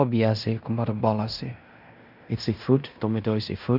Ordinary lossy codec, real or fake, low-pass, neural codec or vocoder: none; fake; 5.4 kHz; codec, 16 kHz in and 24 kHz out, 0.9 kbps, LongCat-Audio-Codec, fine tuned four codebook decoder